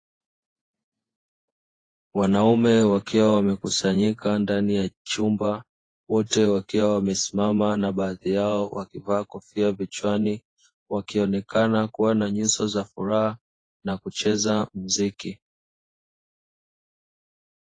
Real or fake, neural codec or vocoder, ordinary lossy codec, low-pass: fake; vocoder, 48 kHz, 128 mel bands, Vocos; AAC, 32 kbps; 9.9 kHz